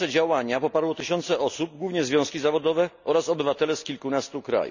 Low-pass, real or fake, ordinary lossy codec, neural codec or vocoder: 7.2 kHz; real; none; none